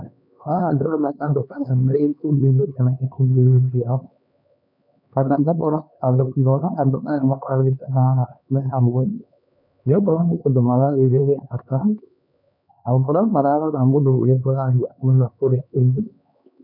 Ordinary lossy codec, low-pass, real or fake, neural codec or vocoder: none; 5.4 kHz; fake; codec, 16 kHz, 2 kbps, X-Codec, HuBERT features, trained on LibriSpeech